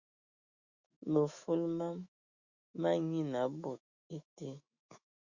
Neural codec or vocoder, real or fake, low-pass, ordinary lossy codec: autoencoder, 48 kHz, 128 numbers a frame, DAC-VAE, trained on Japanese speech; fake; 7.2 kHz; Opus, 64 kbps